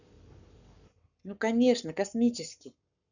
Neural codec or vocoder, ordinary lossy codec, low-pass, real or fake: codec, 44.1 kHz, 7.8 kbps, Pupu-Codec; none; 7.2 kHz; fake